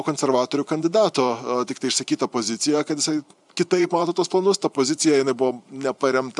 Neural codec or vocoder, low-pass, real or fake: vocoder, 44.1 kHz, 128 mel bands every 512 samples, BigVGAN v2; 10.8 kHz; fake